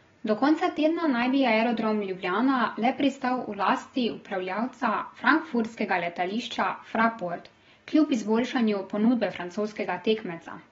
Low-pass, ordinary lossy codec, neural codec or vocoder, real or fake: 7.2 kHz; AAC, 24 kbps; none; real